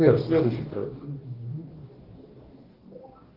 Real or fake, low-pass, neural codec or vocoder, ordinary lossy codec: fake; 5.4 kHz; codec, 44.1 kHz, 2.6 kbps, DAC; Opus, 16 kbps